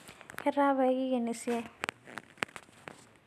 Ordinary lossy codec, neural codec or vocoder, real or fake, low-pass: none; none; real; 14.4 kHz